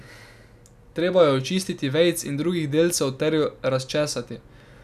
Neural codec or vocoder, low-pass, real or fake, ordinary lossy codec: none; 14.4 kHz; real; none